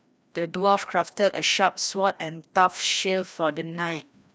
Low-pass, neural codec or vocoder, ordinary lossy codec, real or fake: none; codec, 16 kHz, 1 kbps, FreqCodec, larger model; none; fake